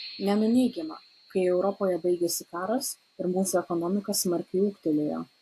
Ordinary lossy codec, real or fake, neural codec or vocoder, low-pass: AAC, 64 kbps; real; none; 14.4 kHz